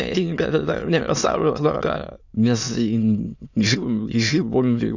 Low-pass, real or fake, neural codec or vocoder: 7.2 kHz; fake; autoencoder, 22.05 kHz, a latent of 192 numbers a frame, VITS, trained on many speakers